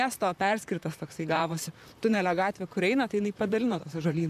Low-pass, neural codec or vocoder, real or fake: 14.4 kHz; vocoder, 44.1 kHz, 128 mel bands, Pupu-Vocoder; fake